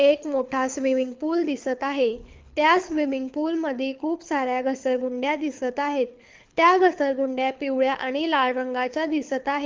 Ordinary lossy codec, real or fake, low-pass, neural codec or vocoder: Opus, 32 kbps; fake; 7.2 kHz; codec, 24 kHz, 6 kbps, HILCodec